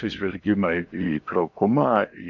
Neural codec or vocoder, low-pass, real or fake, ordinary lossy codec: codec, 16 kHz in and 24 kHz out, 0.8 kbps, FocalCodec, streaming, 65536 codes; 7.2 kHz; fake; MP3, 64 kbps